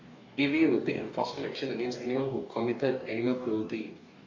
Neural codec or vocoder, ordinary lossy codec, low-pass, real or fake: codec, 44.1 kHz, 2.6 kbps, DAC; AAC, 48 kbps; 7.2 kHz; fake